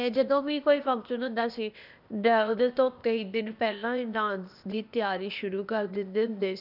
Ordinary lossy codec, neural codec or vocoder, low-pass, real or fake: none; codec, 16 kHz, 0.8 kbps, ZipCodec; 5.4 kHz; fake